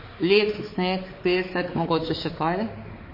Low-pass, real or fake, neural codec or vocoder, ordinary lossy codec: 5.4 kHz; fake; codec, 16 kHz, 4 kbps, X-Codec, HuBERT features, trained on balanced general audio; MP3, 24 kbps